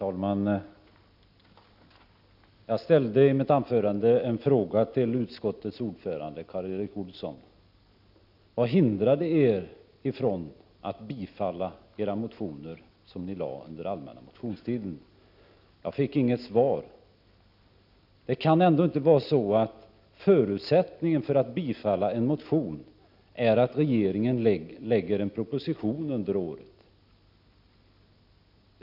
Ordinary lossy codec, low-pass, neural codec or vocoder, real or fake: none; 5.4 kHz; none; real